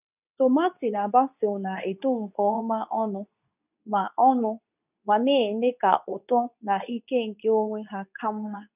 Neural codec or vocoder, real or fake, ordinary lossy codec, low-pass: codec, 24 kHz, 0.9 kbps, WavTokenizer, medium speech release version 2; fake; none; 3.6 kHz